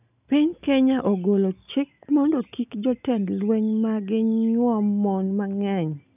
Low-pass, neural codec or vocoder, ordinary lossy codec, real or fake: 3.6 kHz; none; none; real